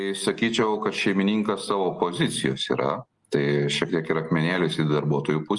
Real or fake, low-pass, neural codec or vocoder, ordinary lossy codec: real; 10.8 kHz; none; Opus, 32 kbps